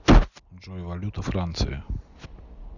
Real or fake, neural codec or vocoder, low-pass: real; none; 7.2 kHz